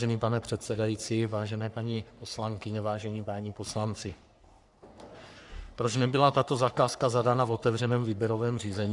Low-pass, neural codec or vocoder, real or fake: 10.8 kHz; codec, 44.1 kHz, 3.4 kbps, Pupu-Codec; fake